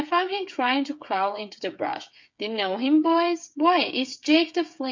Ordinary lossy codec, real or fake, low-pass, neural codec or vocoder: MP3, 64 kbps; fake; 7.2 kHz; codec, 16 kHz, 8 kbps, FreqCodec, smaller model